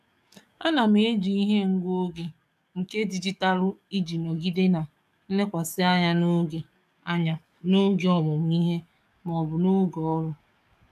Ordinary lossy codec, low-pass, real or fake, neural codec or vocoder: none; 14.4 kHz; fake; codec, 44.1 kHz, 7.8 kbps, DAC